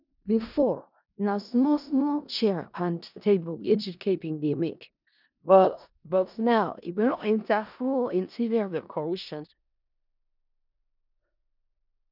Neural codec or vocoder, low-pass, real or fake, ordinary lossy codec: codec, 16 kHz in and 24 kHz out, 0.4 kbps, LongCat-Audio-Codec, four codebook decoder; 5.4 kHz; fake; none